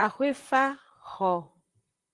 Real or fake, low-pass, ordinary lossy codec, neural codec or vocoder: fake; 10.8 kHz; Opus, 24 kbps; vocoder, 44.1 kHz, 128 mel bands, Pupu-Vocoder